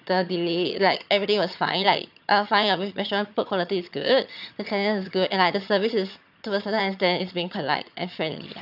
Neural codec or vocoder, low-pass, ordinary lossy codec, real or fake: vocoder, 22.05 kHz, 80 mel bands, HiFi-GAN; 5.4 kHz; none; fake